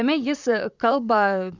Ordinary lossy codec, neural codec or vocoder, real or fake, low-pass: Opus, 64 kbps; vocoder, 44.1 kHz, 128 mel bands, Pupu-Vocoder; fake; 7.2 kHz